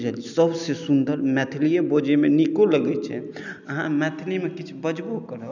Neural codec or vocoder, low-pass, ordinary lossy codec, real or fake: none; 7.2 kHz; none; real